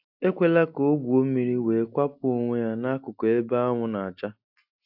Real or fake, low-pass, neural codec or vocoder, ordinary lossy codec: real; 5.4 kHz; none; AAC, 48 kbps